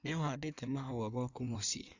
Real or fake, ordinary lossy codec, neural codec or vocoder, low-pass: fake; Opus, 64 kbps; codec, 16 kHz in and 24 kHz out, 1.1 kbps, FireRedTTS-2 codec; 7.2 kHz